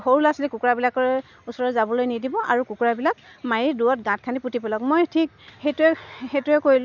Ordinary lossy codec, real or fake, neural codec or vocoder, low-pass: none; real; none; 7.2 kHz